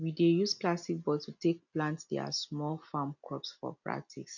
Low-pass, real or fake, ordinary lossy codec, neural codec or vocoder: 7.2 kHz; real; none; none